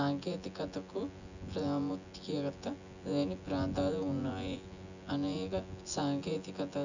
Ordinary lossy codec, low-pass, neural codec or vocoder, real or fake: none; 7.2 kHz; vocoder, 24 kHz, 100 mel bands, Vocos; fake